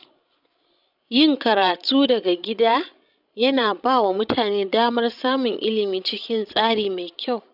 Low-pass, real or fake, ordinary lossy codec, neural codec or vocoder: 5.4 kHz; fake; none; codec, 16 kHz, 16 kbps, FreqCodec, larger model